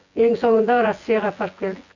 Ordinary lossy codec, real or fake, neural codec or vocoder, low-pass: none; fake; vocoder, 24 kHz, 100 mel bands, Vocos; 7.2 kHz